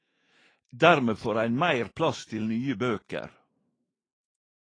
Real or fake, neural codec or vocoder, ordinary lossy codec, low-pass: fake; autoencoder, 48 kHz, 128 numbers a frame, DAC-VAE, trained on Japanese speech; AAC, 32 kbps; 9.9 kHz